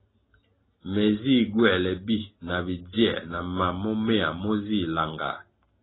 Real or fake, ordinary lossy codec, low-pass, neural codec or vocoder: real; AAC, 16 kbps; 7.2 kHz; none